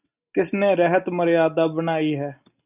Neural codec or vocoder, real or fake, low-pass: none; real; 3.6 kHz